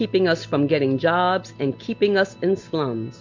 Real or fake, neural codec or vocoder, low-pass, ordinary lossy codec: real; none; 7.2 kHz; MP3, 48 kbps